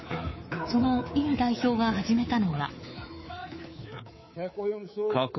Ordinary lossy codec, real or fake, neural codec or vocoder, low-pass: MP3, 24 kbps; fake; codec, 24 kHz, 3.1 kbps, DualCodec; 7.2 kHz